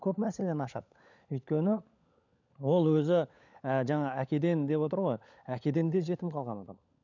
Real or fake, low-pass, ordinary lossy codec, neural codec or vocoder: fake; 7.2 kHz; none; codec, 16 kHz, 16 kbps, FunCodec, trained on LibriTTS, 50 frames a second